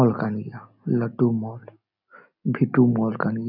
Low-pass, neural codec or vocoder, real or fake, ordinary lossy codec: 5.4 kHz; none; real; none